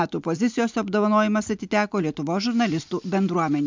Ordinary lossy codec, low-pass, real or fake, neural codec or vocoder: MP3, 64 kbps; 7.2 kHz; real; none